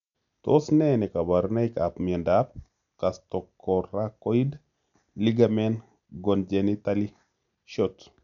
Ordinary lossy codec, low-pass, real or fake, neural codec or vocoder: none; 7.2 kHz; real; none